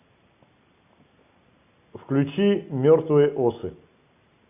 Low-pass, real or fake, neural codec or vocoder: 3.6 kHz; real; none